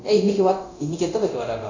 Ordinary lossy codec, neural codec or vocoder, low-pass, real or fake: AAC, 48 kbps; codec, 24 kHz, 0.9 kbps, DualCodec; 7.2 kHz; fake